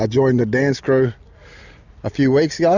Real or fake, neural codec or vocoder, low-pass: real; none; 7.2 kHz